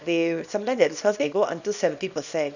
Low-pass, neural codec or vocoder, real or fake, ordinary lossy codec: 7.2 kHz; codec, 24 kHz, 0.9 kbps, WavTokenizer, small release; fake; none